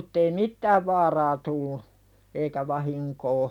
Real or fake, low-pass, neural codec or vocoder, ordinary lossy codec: real; 19.8 kHz; none; none